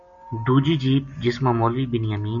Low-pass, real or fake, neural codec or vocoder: 7.2 kHz; real; none